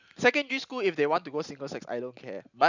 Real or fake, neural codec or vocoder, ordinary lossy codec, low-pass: real; none; none; 7.2 kHz